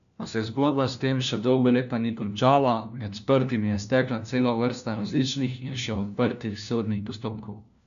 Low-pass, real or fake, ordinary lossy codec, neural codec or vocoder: 7.2 kHz; fake; none; codec, 16 kHz, 1 kbps, FunCodec, trained on LibriTTS, 50 frames a second